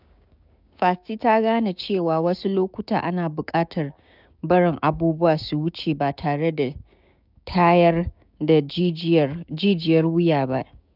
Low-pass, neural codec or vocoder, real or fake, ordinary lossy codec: 5.4 kHz; codec, 16 kHz, 6 kbps, DAC; fake; none